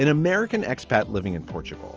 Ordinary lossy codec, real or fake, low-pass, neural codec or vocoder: Opus, 24 kbps; real; 7.2 kHz; none